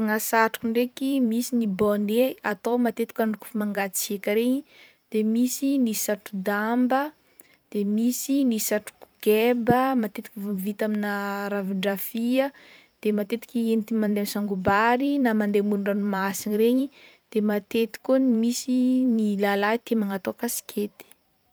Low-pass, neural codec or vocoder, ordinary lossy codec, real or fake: none; vocoder, 44.1 kHz, 128 mel bands every 512 samples, BigVGAN v2; none; fake